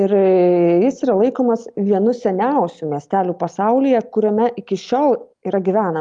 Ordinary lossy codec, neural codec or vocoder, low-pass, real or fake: Opus, 32 kbps; none; 9.9 kHz; real